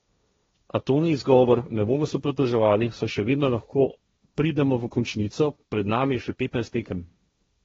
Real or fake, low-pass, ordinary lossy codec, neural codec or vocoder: fake; 7.2 kHz; AAC, 24 kbps; codec, 16 kHz, 1.1 kbps, Voila-Tokenizer